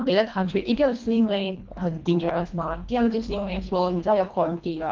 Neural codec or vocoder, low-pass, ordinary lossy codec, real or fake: codec, 24 kHz, 1.5 kbps, HILCodec; 7.2 kHz; Opus, 24 kbps; fake